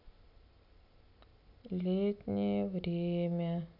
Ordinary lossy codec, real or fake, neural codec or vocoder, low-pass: none; real; none; 5.4 kHz